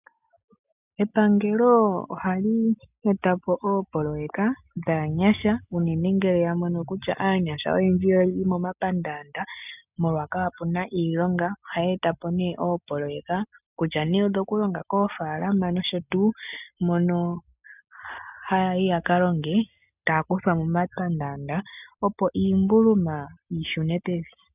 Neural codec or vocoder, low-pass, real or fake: none; 3.6 kHz; real